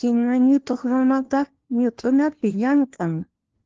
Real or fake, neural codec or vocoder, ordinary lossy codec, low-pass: fake; codec, 16 kHz, 1 kbps, FunCodec, trained on LibriTTS, 50 frames a second; Opus, 16 kbps; 7.2 kHz